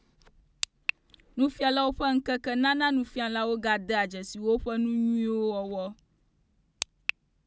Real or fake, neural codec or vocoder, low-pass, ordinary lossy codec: real; none; none; none